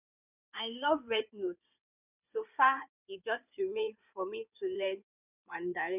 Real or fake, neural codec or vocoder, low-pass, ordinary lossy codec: fake; codec, 16 kHz in and 24 kHz out, 2.2 kbps, FireRedTTS-2 codec; 3.6 kHz; none